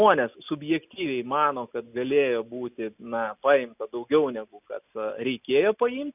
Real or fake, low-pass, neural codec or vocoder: real; 3.6 kHz; none